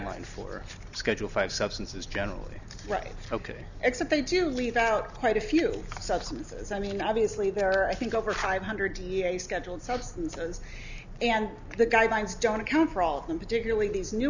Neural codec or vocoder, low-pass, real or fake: none; 7.2 kHz; real